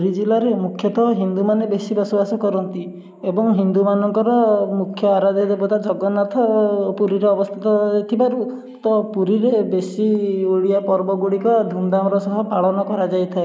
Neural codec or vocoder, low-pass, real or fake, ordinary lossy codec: none; none; real; none